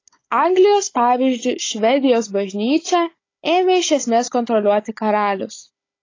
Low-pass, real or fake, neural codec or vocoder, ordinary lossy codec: 7.2 kHz; fake; codec, 16 kHz, 16 kbps, FunCodec, trained on Chinese and English, 50 frames a second; AAC, 32 kbps